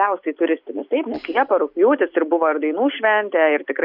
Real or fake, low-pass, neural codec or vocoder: real; 5.4 kHz; none